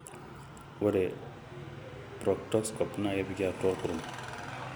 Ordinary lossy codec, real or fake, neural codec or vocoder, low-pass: none; real; none; none